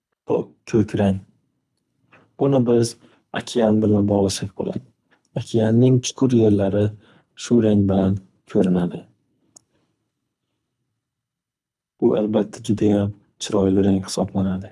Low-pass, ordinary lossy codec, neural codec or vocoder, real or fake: none; none; codec, 24 kHz, 3 kbps, HILCodec; fake